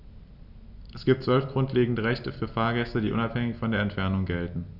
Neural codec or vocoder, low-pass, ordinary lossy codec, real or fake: none; 5.4 kHz; none; real